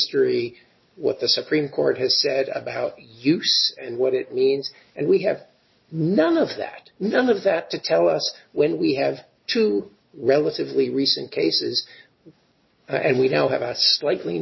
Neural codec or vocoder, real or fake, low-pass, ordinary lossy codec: none; real; 7.2 kHz; MP3, 24 kbps